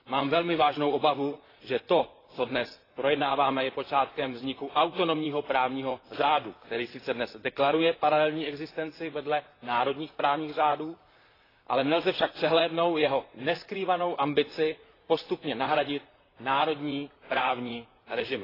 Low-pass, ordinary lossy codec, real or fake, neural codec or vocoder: 5.4 kHz; AAC, 24 kbps; fake; vocoder, 44.1 kHz, 128 mel bands, Pupu-Vocoder